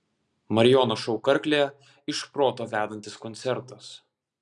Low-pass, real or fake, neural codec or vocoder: 10.8 kHz; real; none